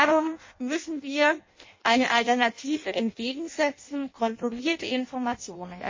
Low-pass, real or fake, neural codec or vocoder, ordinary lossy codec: 7.2 kHz; fake; codec, 16 kHz in and 24 kHz out, 0.6 kbps, FireRedTTS-2 codec; MP3, 32 kbps